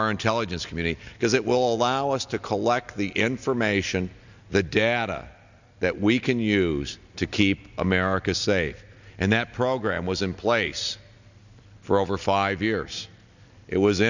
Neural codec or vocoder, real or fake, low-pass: none; real; 7.2 kHz